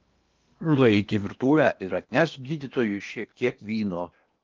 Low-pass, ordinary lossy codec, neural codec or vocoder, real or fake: 7.2 kHz; Opus, 24 kbps; codec, 16 kHz in and 24 kHz out, 0.8 kbps, FocalCodec, streaming, 65536 codes; fake